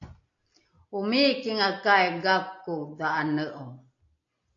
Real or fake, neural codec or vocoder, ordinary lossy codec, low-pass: real; none; AAC, 48 kbps; 7.2 kHz